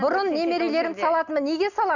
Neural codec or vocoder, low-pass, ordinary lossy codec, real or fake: none; 7.2 kHz; none; real